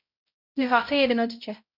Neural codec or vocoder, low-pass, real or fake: codec, 16 kHz, 0.5 kbps, X-Codec, HuBERT features, trained on balanced general audio; 5.4 kHz; fake